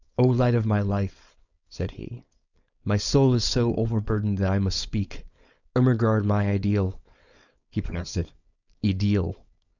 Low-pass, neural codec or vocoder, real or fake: 7.2 kHz; codec, 16 kHz, 4.8 kbps, FACodec; fake